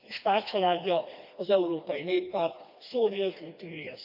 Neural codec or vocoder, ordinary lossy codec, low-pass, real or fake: codec, 16 kHz, 2 kbps, FreqCodec, smaller model; AAC, 48 kbps; 5.4 kHz; fake